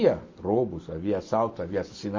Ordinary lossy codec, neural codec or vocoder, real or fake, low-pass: MP3, 32 kbps; none; real; 7.2 kHz